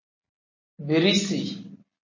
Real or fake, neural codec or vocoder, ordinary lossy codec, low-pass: real; none; MP3, 32 kbps; 7.2 kHz